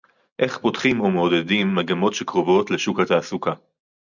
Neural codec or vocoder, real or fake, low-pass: none; real; 7.2 kHz